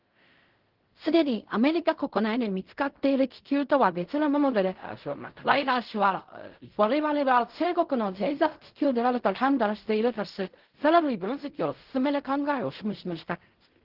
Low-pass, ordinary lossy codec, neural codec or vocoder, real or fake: 5.4 kHz; Opus, 16 kbps; codec, 16 kHz in and 24 kHz out, 0.4 kbps, LongCat-Audio-Codec, fine tuned four codebook decoder; fake